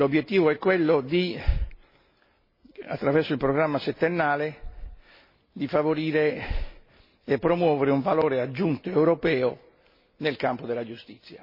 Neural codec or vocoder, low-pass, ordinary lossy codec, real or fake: none; 5.4 kHz; MP3, 24 kbps; real